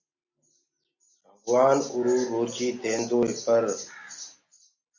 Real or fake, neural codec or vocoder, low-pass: real; none; 7.2 kHz